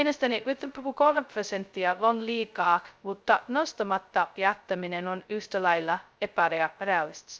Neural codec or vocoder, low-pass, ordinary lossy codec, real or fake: codec, 16 kHz, 0.2 kbps, FocalCodec; none; none; fake